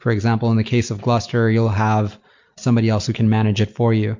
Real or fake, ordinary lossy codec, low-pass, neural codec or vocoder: real; MP3, 48 kbps; 7.2 kHz; none